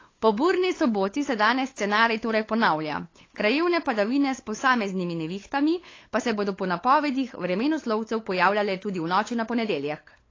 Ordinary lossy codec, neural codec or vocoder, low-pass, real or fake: AAC, 32 kbps; codec, 16 kHz, 8 kbps, FunCodec, trained on LibriTTS, 25 frames a second; 7.2 kHz; fake